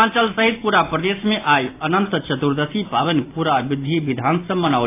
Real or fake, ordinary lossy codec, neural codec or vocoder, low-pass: real; AAC, 24 kbps; none; 3.6 kHz